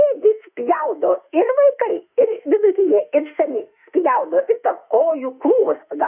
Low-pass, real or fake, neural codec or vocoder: 3.6 kHz; fake; autoencoder, 48 kHz, 32 numbers a frame, DAC-VAE, trained on Japanese speech